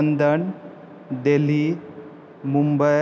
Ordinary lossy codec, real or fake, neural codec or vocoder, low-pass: none; real; none; none